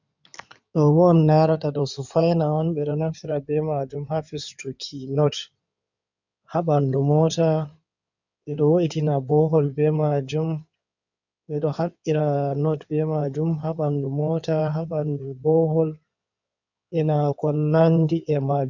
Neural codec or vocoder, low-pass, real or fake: codec, 16 kHz in and 24 kHz out, 2.2 kbps, FireRedTTS-2 codec; 7.2 kHz; fake